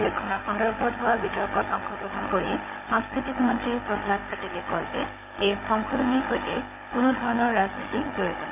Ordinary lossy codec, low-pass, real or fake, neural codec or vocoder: none; 3.6 kHz; fake; codec, 16 kHz, 16 kbps, FunCodec, trained on Chinese and English, 50 frames a second